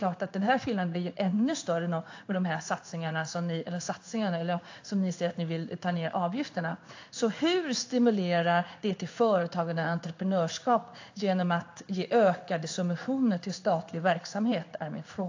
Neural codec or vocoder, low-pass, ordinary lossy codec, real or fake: codec, 16 kHz in and 24 kHz out, 1 kbps, XY-Tokenizer; 7.2 kHz; AAC, 48 kbps; fake